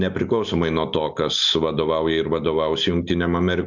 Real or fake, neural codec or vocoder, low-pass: real; none; 7.2 kHz